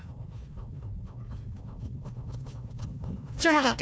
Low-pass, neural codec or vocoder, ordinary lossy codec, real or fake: none; codec, 16 kHz, 1 kbps, FunCodec, trained on Chinese and English, 50 frames a second; none; fake